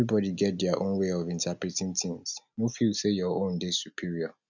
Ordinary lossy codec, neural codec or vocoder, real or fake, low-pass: none; none; real; 7.2 kHz